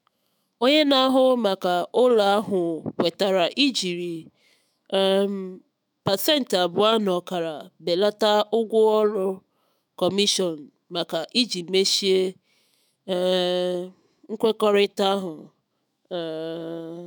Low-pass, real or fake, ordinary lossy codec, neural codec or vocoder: none; fake; none; autoencoder, 48 kHz, 128 numbers a frame, DAC-VAE, trained on Japanese speech